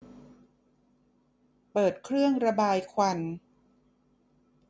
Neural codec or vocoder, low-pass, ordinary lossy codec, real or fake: none; none; none; real